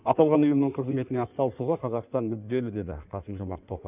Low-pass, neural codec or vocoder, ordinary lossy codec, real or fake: 3.6 kHz; codec, 16 kHz in and 24 kHz out, 1.1 kbps, FireRedTTS-2 codec; none; fake